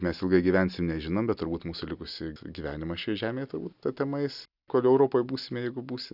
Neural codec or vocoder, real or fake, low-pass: none; real; 5.4 kHz